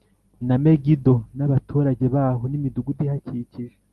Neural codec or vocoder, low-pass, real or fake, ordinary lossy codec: none; 14.4 kHz; real; Opus, 24 kbps